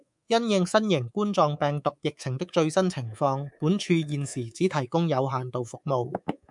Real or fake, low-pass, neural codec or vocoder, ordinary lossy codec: fake; 10.8 kHz; codec, 24 kHz, 3.1 kbps, DualCodec; MP3, 96 kbps